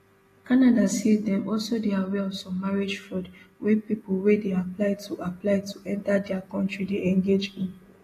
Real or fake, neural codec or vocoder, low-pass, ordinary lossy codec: real; none; 14.4 kHz; AAC, 48 kbps